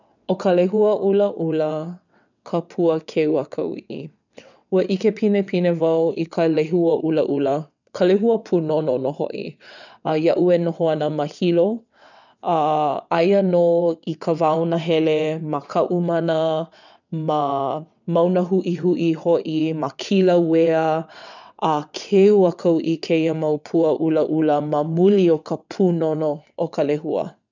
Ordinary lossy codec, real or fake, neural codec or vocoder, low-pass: none; fake; vocoder, 22.05 kHz, 80 mel bands, WaveNeXt; 7.2 kHz